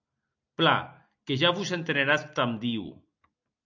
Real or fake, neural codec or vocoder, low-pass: real; none; 7.2 kHz